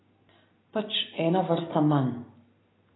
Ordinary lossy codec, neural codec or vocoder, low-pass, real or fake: AAC, 16 kbps; none; 7.2 kHz; real